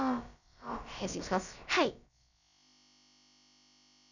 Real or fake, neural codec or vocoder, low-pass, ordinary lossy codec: fake; codec, 16 kHz, about 1 kbps, DyCAST, with the encoder's durations; 7.2 kHz; none